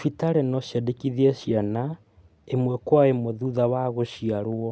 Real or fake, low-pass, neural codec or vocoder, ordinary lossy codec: real; none; none; none